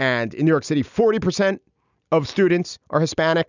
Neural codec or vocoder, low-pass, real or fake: none; 7.2 kHz; real